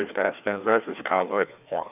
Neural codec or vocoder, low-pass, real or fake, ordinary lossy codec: codec, 16 kHz, 2 kbps, FreqCodec, larger model; 3.6 kHz; fake; none